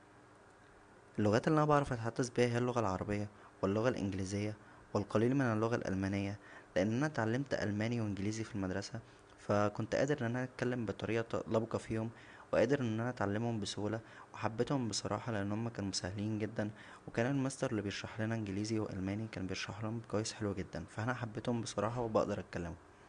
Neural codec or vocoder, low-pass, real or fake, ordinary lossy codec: none; 9.9 kHz; real; Opus, 64 kbps